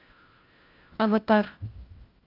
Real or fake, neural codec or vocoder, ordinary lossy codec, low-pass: fake; codec, 16 kHz, 1 kbps, FunCodec, trained on LibriTTS, 50 frames a second; Opus, 24 kbps; 5.4 kHz